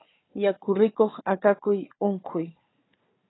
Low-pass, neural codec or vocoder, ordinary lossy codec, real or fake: 7.2 kHz; codec, 16 kHz, 4 kbps, FunCodec, trained on Chinese and English, 50 frames a second; AAC, 16 kbps; fake